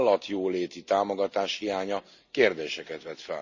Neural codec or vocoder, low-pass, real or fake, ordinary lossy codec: none; 7.2 kHz; real; none